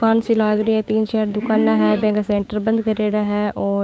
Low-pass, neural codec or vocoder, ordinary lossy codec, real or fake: none; codec, 16 kHz, 6 kbps, DAC; none; fake